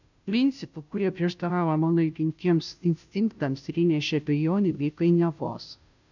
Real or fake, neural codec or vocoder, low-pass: fake; codec, 16 kHz, 0.5 kbps, FunCodec, trained on Chinese and English, 25 frames a second; 7.2 kHz